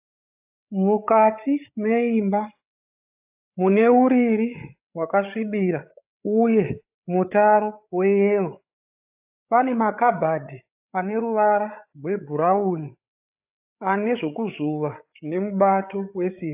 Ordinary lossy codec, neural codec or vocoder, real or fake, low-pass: AAC, 32 kbps; codec, 16 kHz, 8 kbps, FreqCodec, larger model; fake; 3.6 kHz